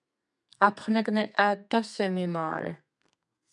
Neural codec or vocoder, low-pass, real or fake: codec, 32 kHz, 1.9 kbps, SNAC; 10.8 kHz; fake